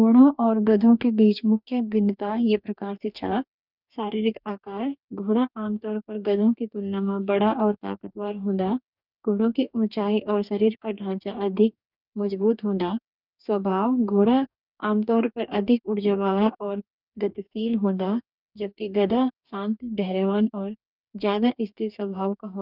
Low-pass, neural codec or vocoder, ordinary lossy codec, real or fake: 5.4 kHz; codec, 44.1 kHz, 2.6 kbps, DAC; none; fake